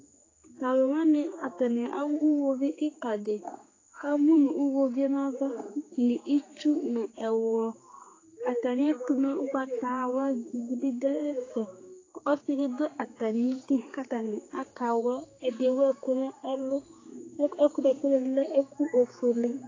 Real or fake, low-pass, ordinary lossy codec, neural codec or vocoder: fake; 7.2 kHz; AAC, 32 kbps; codec, 16 kHz, 4 kbps, X-Codec, HuBERT features, trained on general audio